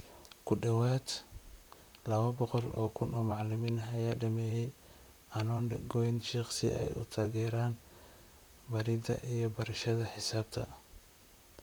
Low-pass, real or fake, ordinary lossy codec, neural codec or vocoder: none; fake; none; vocoder, 44.1 kHz, 128 mel bands, Pupu-Vocoder